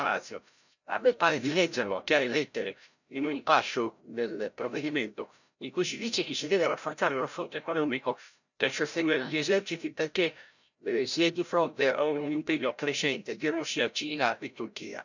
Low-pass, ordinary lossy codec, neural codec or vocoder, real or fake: 7.2 kHz; none; codec, 16 kHz, 0.5 kbps, FreqCodec, larger model; fake